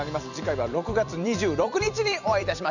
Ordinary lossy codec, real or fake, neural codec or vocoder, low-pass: none; fake; vocoder, 44.1 kHz, 128 mel bands every 256 samples, BigVGAN v2; 7.2 kHz